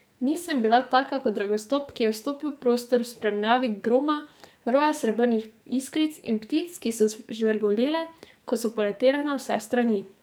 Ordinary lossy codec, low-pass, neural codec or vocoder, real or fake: none; none; codec, 44.1 kHz, 2.6 kbps, SNAC; fake